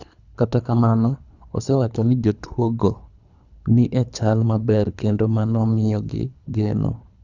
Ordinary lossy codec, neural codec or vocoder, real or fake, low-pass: none; codec, 24 kHz, 3 kbps, HILCodec; fake; 7.2 kHz